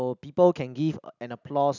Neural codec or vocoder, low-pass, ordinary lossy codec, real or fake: none; 7.2 kHz; none; real